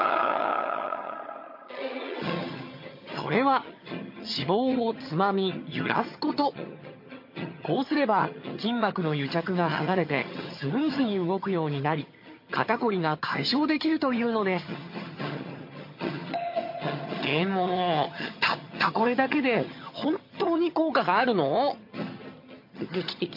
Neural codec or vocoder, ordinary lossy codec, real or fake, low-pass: vocoder, 22.05 kHz, 80 mel bands, HiFi-GAN; MP3, 32 kbps; fake; 5.4 kHz